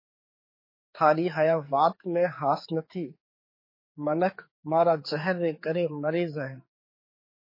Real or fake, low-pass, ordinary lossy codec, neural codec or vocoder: fake; 5.4 kHz; MP3, 24 kbps; codec, 16 kHz, 4 kbps, X-Codec, HuBERT features, trained on general audio